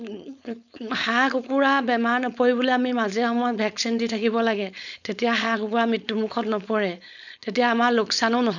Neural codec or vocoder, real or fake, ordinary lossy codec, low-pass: codec, 16 kHz, 4.8 kbps, FACodec; fake; none; 7.2 kHz